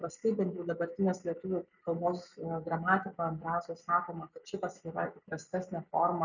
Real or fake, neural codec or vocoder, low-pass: real; none; 7.2 kHz